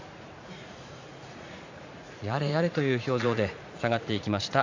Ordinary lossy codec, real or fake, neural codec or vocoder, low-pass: AAC, 48 kbps; fake; vocoder, 44.1 kHz, 80 mel bands, Vocos; 7.2 kHz